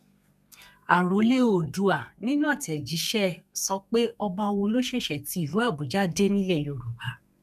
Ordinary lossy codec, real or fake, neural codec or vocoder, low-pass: none; fake; codec, 32 kHz, 1.9 kbps, SNAC; 14.4 kHz